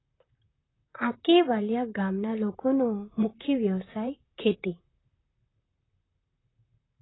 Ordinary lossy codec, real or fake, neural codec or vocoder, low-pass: AAC, 16 kbps; fake; vocoder, 44.1 kHz, 128 mel bands, Pupu-Vocoder; 7.2 kHz